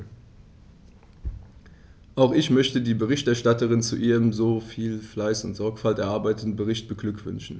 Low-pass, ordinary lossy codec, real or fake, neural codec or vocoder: none; none; real; none